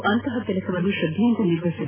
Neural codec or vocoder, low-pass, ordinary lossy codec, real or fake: none; 3.6 kHz; AAC, 16 kbps; real